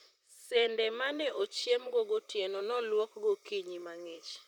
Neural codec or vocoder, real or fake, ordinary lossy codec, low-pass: vocoder, 44.1 kHz, 128 mel bands, Pupu-Vocoder; fake; none; 19.8 kHz